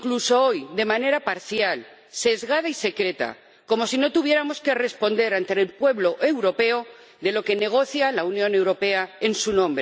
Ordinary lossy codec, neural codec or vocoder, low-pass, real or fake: none; none; none; real